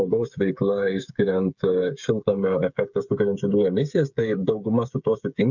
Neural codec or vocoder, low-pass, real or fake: codec, 16 kHz, 8 kbps, FreqCodec, smaller model; 7.2 kHz; fake